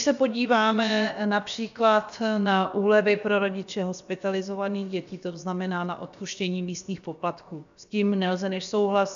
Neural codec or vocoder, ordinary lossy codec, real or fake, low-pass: codec, 16 kHz, about 1 kbps, DyCAST, with the encoder's durations; AAC, 96 kbps; fake; 7.2 kHz